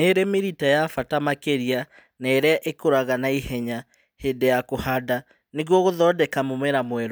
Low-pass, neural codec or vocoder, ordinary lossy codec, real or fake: none; none; none; real